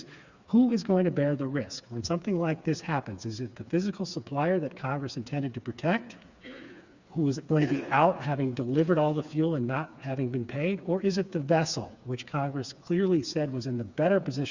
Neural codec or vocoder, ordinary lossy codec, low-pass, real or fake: codec, 16 kHz, 4 kbps, FreqCodec, smaller model; Opus, 64 kbps; 7.2 kHz; fake